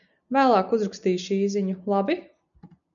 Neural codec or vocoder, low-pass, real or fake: none; 7.2 kHz; real